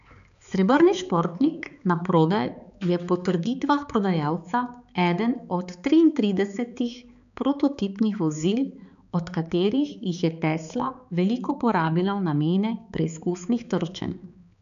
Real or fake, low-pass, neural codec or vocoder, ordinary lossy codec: fake; 7.2 kHz; codec, 16 kHz, 4 kbps, X-Codec, HuBERT features, trained on balanced general audio; none